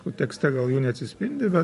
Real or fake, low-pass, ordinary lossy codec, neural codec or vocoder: fake; 14.4 kHz; MP3, 48 kbps; vocoder, 44.1 kHz, 128 mel bands every 512 samples, BigVGAN v2